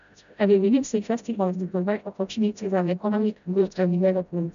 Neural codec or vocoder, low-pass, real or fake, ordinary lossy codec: codec, 16 kHz, 0.5 kbps, FreqCodec, smaller model; 7.2 kHz; fake; none